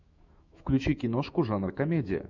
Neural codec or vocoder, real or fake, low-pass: autoencoder, 48 kHz, 128 numbers a frame, DAC-VAE, trained on Japanese speech; fake; 7.2 kHz